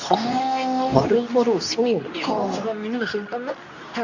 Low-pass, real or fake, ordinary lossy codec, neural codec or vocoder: 7.2 kHz; fake; none; codec, 24 kHz, 0.9 kbps, WavTokenizer, medium speech release version 2